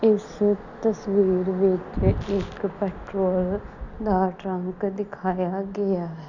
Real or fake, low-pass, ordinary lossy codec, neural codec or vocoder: real; 7.2 kHz; none; none